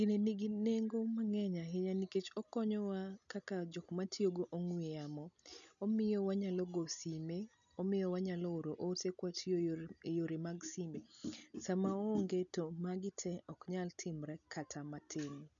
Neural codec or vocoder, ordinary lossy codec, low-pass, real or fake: none; none; 7.2 kHz; real